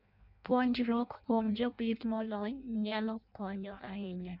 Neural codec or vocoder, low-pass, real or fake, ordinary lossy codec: codec, 16 kHz in and 24 kHz out, 0.6 kbps, FireRedTTS-2 codec; 5.4 kHz; fake; none